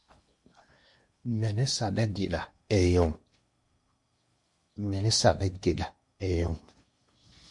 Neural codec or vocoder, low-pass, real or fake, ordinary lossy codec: codec, 16 kHz in and 24 kHz out, 0.8 kbps, FocalCodec, streaming, 65536 codes; 10.8 kHz; fake; MP3, 48 kbps